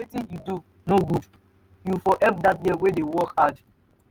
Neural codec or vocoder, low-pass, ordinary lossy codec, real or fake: none; 19.8 kHz; Opus, 32 kbps; real